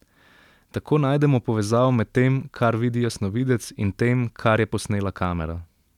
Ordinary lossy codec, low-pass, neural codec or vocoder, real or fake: none; 19.8 kHz; none; real